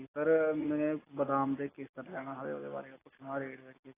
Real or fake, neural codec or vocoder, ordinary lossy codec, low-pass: real; none; AAC, 16 kbps; 3.6 kHz